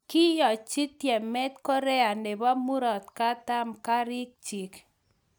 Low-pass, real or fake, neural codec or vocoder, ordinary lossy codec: none; real; none; none